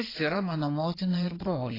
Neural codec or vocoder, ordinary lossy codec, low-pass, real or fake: codec, 16 kHz in and 24 kHz out, 2.2 kbps, FireRedTTS-2 codec; AAC, 24 kbps; 5.4 kHz; fake